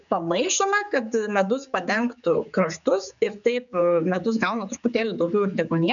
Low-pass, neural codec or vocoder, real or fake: 7.2 kHz; codec, 16 kHz, 4 kbps, X-Codec, HuBERT features, trained on general audio; fake